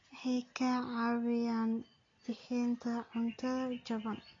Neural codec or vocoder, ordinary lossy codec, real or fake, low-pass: none; AAC, 32 kbps; real; 7.2 kHz